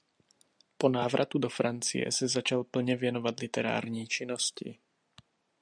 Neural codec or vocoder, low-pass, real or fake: none; 9.9 kHz; real